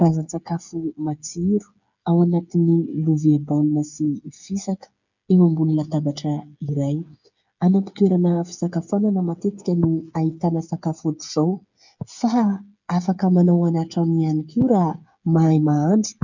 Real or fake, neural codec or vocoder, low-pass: fake; codec, 16 kHz, 8 kbps, FreqCodec, smaller model; 7.2 kHz